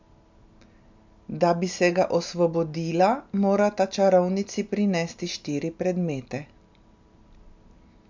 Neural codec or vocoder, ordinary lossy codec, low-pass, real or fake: none; MP3, 64 kbps; 7.2 kHz; real